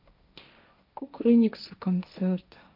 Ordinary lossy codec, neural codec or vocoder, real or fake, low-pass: none; codec, 16 kHz, 1.1 kbps, Voila-Tokenizer; fake; 5.4 kHz